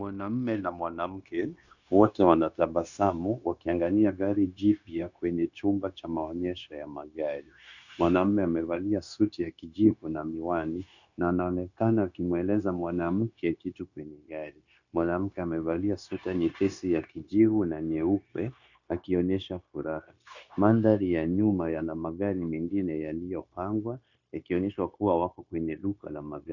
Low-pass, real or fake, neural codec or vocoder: 7.2 kHz; fake; codec, 16 kHz, 0.9 kbps, LongCat-Audio-Codec